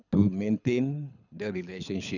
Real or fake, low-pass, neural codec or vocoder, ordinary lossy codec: fake; 7.2 kHz; codec, 24 kHz, 6 kbps, HILCodec; none